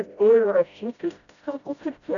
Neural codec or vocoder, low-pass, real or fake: codec, 16 kHz, 0.5 kbps, FreqCodec, smaller model; 7.2 kHz; fake